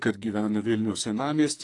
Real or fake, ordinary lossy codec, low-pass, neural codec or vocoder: fake; AAC, 48 kbps; 10.8 kHz; codec, 44.1 kHz, 2.6 kbps, SNAC